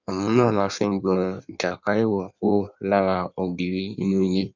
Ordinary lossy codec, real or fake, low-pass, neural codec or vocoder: none; fake; 7.2 kHz; codec, 16 kHz in and 24 kHz out, 1.1 kbps, FireRedTTS-2 codec